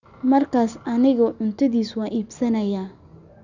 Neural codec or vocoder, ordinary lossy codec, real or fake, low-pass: none; none; real; 7.2 kHz